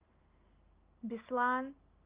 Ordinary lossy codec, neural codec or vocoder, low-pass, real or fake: Opus, 32 kbps; none; 3.6 kHz; real